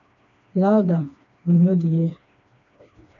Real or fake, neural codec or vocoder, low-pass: fake; codec, 16 kHz, 2 kbps, FreqCodec, smaller model; 7.2 kHz